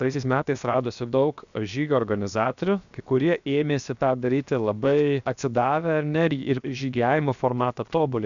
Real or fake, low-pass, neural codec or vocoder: fake; 7.2 kHz; codec, 16 kHz, 0.7 kbps, FocalCodec